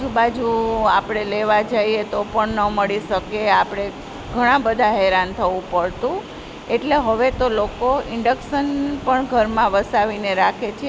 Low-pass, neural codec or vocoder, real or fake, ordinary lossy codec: none; none; real; none